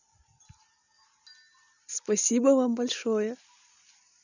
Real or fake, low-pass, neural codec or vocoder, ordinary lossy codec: real; 7.2 kHz; none; none